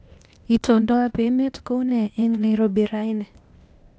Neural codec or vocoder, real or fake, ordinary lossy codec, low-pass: codec, 16 kHz, 0.8 kbps, ZipCodec; fake; none; none